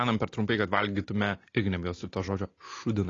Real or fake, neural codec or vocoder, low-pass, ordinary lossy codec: real; none; 7.2 kHz; AAC, 32 kbps